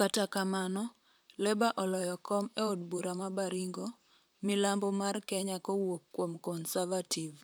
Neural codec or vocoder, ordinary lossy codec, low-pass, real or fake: vocoder, 44.1 kHz, 128 mel bands, Pupu-Vocoder; none; none; fake